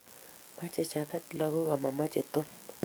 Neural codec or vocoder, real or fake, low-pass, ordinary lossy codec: codec, 44.1 kHz, 7.8 kbps, DAC; fake; none; none